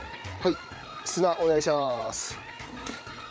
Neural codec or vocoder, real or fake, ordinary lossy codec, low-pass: codec, 16 kHz, 8 kbps, FreqCodec, larger model; fake; none; none